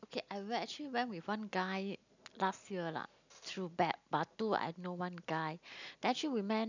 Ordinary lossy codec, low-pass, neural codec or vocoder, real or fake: none; 7.2 kHz; none; real